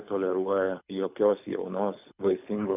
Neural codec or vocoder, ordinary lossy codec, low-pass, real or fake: codec, 24 kHz, 6 kbps, HILCodec; AAC, 32 kbps; 3.6 kHz; fake